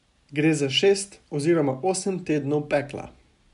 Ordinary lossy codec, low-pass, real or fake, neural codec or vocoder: MP3, 96 kbps; 10.8 kHz; real; none